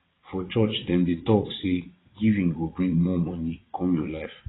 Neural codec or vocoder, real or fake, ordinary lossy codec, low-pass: vocoder, 22.05 kHz, 80 mel bands, WaveNeXt; fake; AAC, 16 kbps; 7.2 kHz